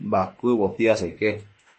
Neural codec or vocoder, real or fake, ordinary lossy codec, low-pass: autoencoder, 48 kHz, 32 numbers a frame, DAC-VAE, trained on Japanese speech; fake; MP3, 32 kbps; 10.8 kHz